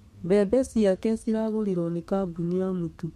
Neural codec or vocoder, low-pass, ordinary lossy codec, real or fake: codec, 32 kHz, 1.9 kbps, SNAC; 14.4 kHz; MP3, 64 kbps; fake